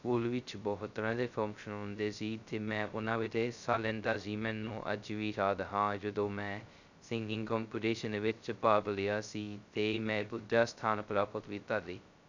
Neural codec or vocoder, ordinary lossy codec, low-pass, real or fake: codec, 16 kHz, 0.2 kbps, FocalCodec; none; 7.2 kHz; fake